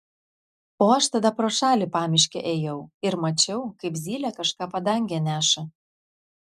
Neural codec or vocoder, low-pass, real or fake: none; 14.4 kHz; real